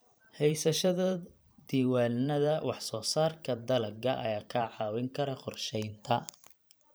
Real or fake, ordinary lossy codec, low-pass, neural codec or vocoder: fake; none; none; vocoder, 44.1 kHz, 128 mel bands every 512 samples, BigVGAN v2